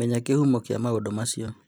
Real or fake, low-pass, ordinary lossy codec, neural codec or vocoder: fake; none; none; vocoder, 44.1 kHz, 128 mel bands every 256 samples, BigVGAN v2